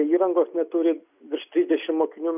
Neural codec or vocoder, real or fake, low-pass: none; real; 3.6 kHz